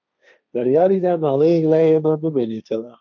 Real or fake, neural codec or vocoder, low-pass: fake; codec, 16 kHz, 1.1 kbps, Voila-Tokenizer; 7.2 kHz